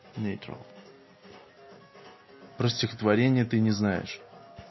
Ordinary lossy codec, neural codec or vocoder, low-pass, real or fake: MP3, 24 kbps; none; 7.2 kHz; real